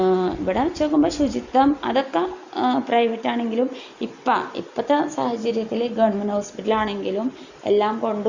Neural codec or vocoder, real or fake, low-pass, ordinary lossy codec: none; real; 7.2 kHz; Opus, 64 kbps